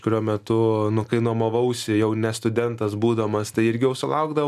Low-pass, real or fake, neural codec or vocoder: 14.4 kHz; real; none